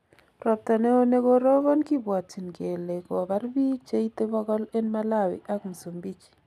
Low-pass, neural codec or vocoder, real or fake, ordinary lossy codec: 14.4 kHz; none; real; none